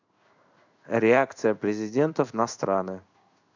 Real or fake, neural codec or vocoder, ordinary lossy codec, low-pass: fake; codec, 16 kHz in and 24 kHz out, 1 kbps, XY-Tokenizer; none; 7.2 kHz